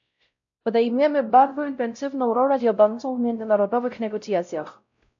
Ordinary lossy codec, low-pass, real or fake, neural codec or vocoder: AAC, 64 kbps; 7.2 kHz; fake; codec, 16 kHz, 0.5 kbps, X-Codec, WavLM features, trained on Multilingual LibriSpeech